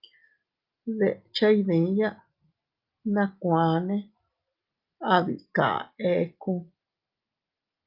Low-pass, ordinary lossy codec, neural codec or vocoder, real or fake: 5.4 kHz; Opus, 24 kbps; none; real